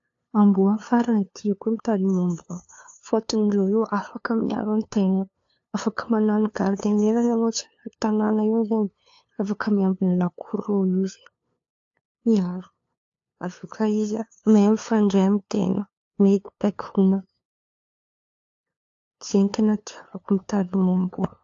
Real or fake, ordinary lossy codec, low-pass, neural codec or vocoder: fake; AAC, 48 kbps; 7.2 kHz; codec, 16 kHz, 2 kbps, FunCodec, trained on LibriTTS, 25 frames a second